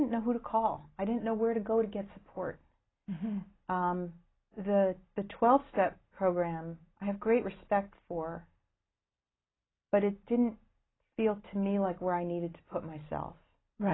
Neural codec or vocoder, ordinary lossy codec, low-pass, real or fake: none; AAC, 16 kbps; 7.2 kHz; real